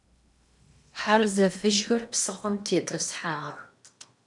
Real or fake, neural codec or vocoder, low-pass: fake; codec, 16 kHz in and 24 kHz out, 0.8 kbps, FocalCodec, streaming, 65536 codes; 10.8 kHz